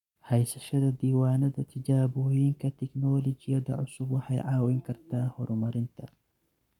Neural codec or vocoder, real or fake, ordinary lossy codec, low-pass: codec, 44.1 kHz, 7.8 kbps, Pupu-Codec; fake; none; 19.8 kHz